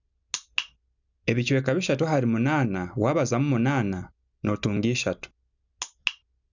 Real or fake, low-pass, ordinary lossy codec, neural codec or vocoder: real; 7.2 kHz; none; none